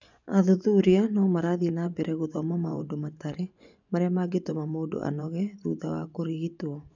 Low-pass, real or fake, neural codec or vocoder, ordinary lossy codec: 7.2 kHz; fake; vocoder, 24 kHz, 100 mel bands, Vocos; none